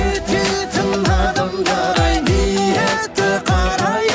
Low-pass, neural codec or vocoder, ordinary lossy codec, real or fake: none; none; none; real